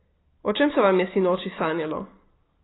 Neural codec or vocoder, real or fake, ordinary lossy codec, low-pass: none; real; AAC, 16 kbps; 7.2 kHz